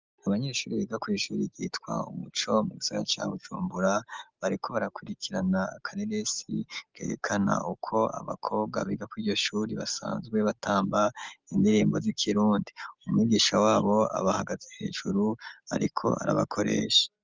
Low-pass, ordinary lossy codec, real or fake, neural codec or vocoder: 7.2 kHz; Opus, 32 kbps; real; none